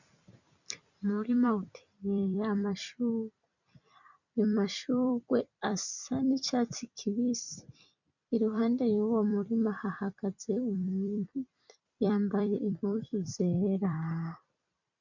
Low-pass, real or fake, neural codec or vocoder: 7.2 kHz; fake; vocoder, 44.1 kHz, 128 mel bands every 256 samples, BigVGAN v2